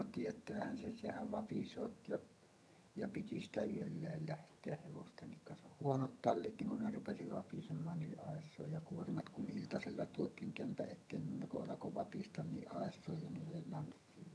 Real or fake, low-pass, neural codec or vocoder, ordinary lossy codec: fake; none; vocoder, 22.05 kHz, 80 mel bands, HiFi-GAN; none